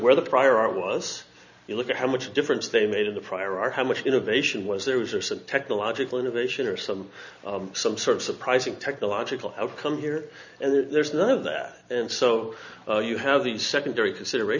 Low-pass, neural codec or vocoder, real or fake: 7.2 kHz; none; real